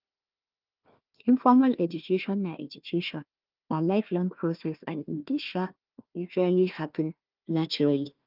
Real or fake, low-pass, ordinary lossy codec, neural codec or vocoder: fake; 5.4 kHz; Opus, 32 kbps; codec, 16 kHz, 1 kbps, FunCodec, trained on Chinese and English, 50 frames a second